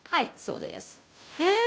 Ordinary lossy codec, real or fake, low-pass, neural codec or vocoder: none; fake; none; codec, 16 kHz, 0.5 kbps, FunCodec, trained on Chinese and English, 25 frames a second